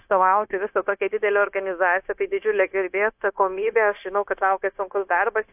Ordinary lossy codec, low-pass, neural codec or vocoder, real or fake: AAC, 32 kbps; 3.6 kHz; codec, 16 kHz, 0.9 kbps, LongCat-Audio-Codec; fake